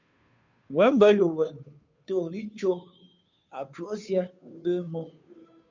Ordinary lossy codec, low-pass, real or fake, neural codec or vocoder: MP3, 64 kbps; 7.2 kHz; fake; codec, 16 kHz, 2 kbps, FunCodec, trained on Chinese and English, 25 frames a second